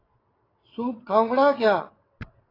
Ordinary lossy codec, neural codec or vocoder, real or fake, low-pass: AAC, 24 kbps; vocoder, 22.05 kHz, 80 mel bands, WaveNeXt; fake; 5.4 kHz